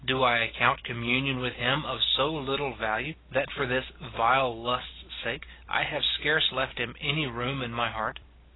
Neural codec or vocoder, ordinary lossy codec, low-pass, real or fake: none; AAC, 16 kbps; 7.2 kHz; real